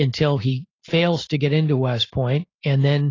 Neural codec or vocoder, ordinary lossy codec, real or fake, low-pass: none; AAC, 32 kbps; real; 7.2 kHz